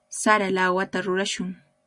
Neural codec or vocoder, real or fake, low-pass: none; real; 10.8 kHz